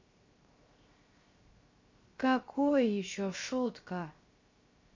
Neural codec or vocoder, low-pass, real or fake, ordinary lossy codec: codec, 16 kHz, 0.7 kbps, FocalCodec; 7.2 kHz; fake; MP3, 32 kbps